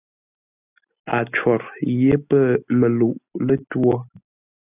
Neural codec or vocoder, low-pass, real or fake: none; 3.6 kHz; real